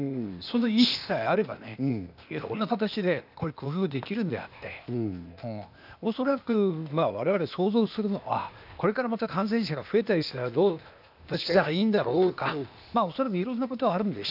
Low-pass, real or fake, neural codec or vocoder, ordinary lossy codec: 5.4 kHz; fake; codec, 16 kHz, 0.8 kbps, ZipCodec; none